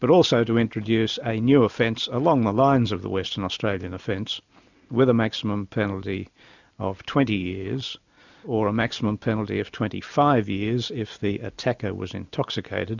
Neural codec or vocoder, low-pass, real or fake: none; 7.2 kHz; real